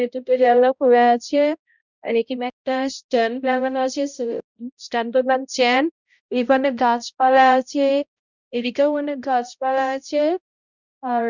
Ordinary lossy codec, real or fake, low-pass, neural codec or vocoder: none; fake; 7.2 kHz; codec, 16 kHz, 0.5 kbps, X-Codec, HuBERT features, trained on balanced general audio